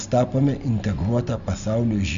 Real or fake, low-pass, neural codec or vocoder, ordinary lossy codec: real; 7.2 kHz; none; MP3, 64 kbps